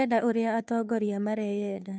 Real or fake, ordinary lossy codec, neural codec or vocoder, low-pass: fake; none; codec, 16 kHz, 2 kbps, FunCodec, trained on Chinese and English, 25 frames a second; none